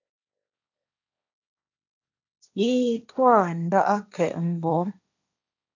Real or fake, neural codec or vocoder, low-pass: fake; codec, 16 kHz, 1.1 kbps, Voila-Tokenizer; 7.2 kHz